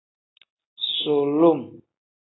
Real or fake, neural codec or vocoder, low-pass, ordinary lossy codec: real; none; 7.2 kHz; AAC, 16 kbps